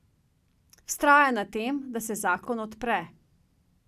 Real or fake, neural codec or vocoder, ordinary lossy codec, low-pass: real; none; none; 14.4 kHz